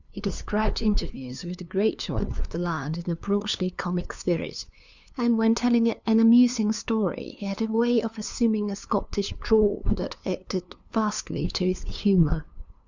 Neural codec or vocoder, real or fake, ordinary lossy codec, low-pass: codec, 16 kHz, 2 kbps, FunCodec, trained on LibriTTS, 25 frames a second; fake; Opus, 64 kbps; 7.2 kHz